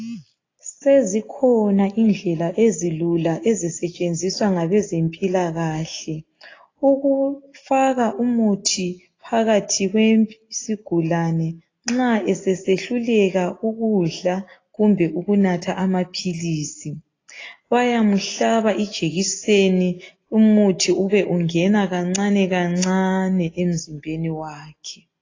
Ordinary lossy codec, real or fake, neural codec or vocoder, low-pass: AAC, 32 kbps; real; none; 7.2 kHz